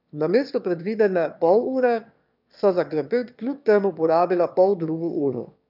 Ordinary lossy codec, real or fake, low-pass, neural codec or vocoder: none; fake; 5.4 kHz; autoencoder, 22.05 kHz, a latent of 192 numbers a frame, VITS, trained on one speaker